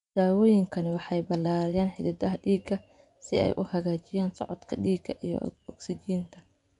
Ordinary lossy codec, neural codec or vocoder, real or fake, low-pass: none; vocoder, 24 kHz, 100 mel bands, Vocos; fake; 10.8 kHz